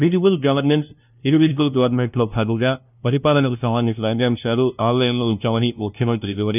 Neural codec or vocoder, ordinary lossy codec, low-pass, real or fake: codec, 16 kHz, 0.5 kbps, FunCodec, trained on LibriTTS, 25 frames a second; none; 3.6 kHz; fake